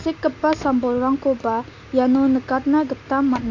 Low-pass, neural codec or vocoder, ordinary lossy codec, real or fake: 7.2 kHz; none; AAC, 48 kbps; real